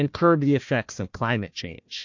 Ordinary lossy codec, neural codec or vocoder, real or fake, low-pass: MP3, 48 kbps; codec, 16 kHz, 1 kbps, FunCodec, trained on Chinese and English, 50 frames a second; fake; 7.2 kHz